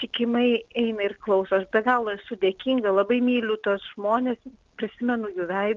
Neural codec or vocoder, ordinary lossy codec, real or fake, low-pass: none; Opus, 24 kbps; real; 7.2 kHz